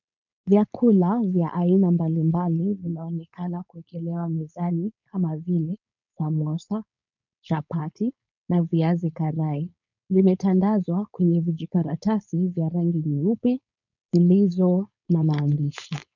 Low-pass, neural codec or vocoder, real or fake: 7.2 kHz; codec, 16 kHz, 4.8 kbps, FACodec; fake